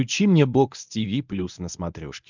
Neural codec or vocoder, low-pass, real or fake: codec, 24 kHz, 0.9 kbps, WavTokenizer, medium speech release version 1; 7.2 kHz; fake